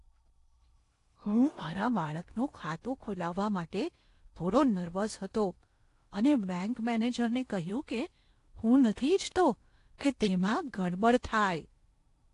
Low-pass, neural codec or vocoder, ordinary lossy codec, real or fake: 10.8 kHz; codec, 16 kHz in and 24 kHz out, 0.8 kbps, FocalCodec, streaming, 65536 codes; AAC, 48 kbps; fake